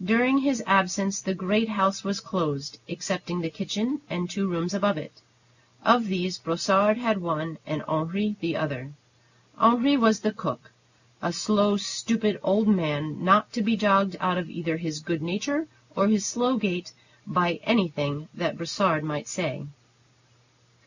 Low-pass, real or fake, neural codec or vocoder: 7.2 kHz; real; none